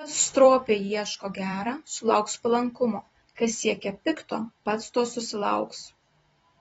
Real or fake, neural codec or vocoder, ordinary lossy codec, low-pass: fake; vocoder, 44.1 kHz, 128 mel bands every 256 samples, BigVGAN v2; AAC, 24 kbps; 19.8 kHz